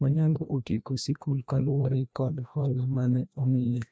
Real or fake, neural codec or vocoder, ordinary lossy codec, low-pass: fake; codec, 16 kHz, 1 kbps, FreqCodec, larger model; none; none